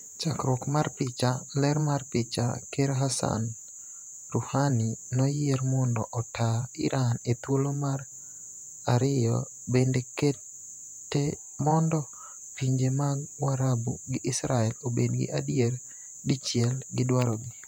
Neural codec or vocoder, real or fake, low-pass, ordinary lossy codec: vocoder, 48 kHz, 128 mel bands, Vocos; fake; 19.8 kHz; none